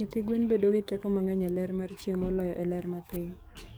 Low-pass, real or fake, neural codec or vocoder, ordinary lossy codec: none; fake; codec, 44.1 kHz, 7.8 kbps, DAC; none